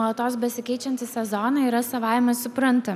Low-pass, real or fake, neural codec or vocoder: 14.4 kHz; real; none